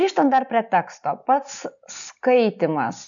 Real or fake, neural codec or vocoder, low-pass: real; none; 7.2 kHz